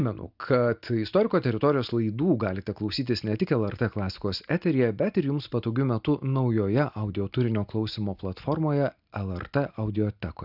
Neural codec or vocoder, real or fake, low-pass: none; real; 5.4 kHz